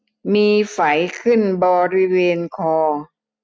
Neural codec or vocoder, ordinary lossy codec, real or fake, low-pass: none; none; real; none